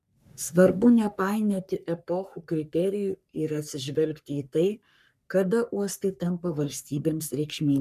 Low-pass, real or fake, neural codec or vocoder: 14.4 kHz; fake; codec, 44.1 kHz, 3.4 kbps, Pupu-Codec